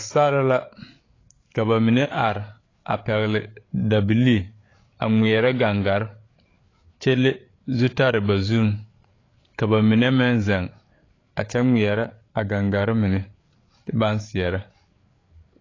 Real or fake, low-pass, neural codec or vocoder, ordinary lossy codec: fake; 7.2 kHz; codec, 16 kHz, 8 kbps, FreqCodec, larger model; AAC, 32 kbps